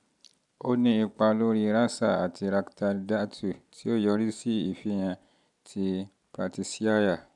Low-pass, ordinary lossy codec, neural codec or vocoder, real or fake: 10.8 kHz; none; none; real